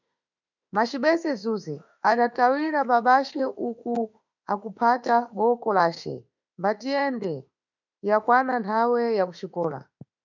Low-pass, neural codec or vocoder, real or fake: 7.2 kHz; autoencoder, 48 kHz, 32 numbers a frame, DAC-VAE, trained on Japanese speech; fake